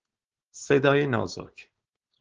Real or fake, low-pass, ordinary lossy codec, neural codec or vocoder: fake; 7.2 kHz; Opus, 16 kbps; codec, 16 kHz, 4.8 kbps, FACodec